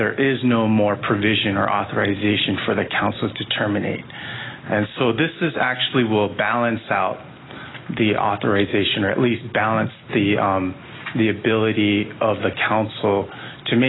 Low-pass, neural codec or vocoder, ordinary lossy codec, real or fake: 7.2 kHz; none; AAC, 16 kbps; real